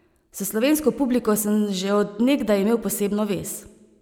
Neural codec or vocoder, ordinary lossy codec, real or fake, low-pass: none; none; real; 19.8 kHz